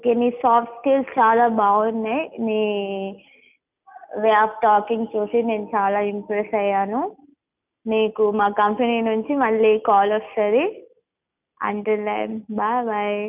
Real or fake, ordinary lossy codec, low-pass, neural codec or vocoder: real; none; 3.6 kHz; none